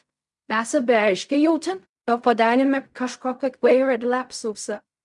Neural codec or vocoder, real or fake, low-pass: codec, 16 kHz in and 24 kHz out, 0.4 kbps, LongCat-Audio-Codec, fine tuned four codebook decoder; fake; 10.8 kHz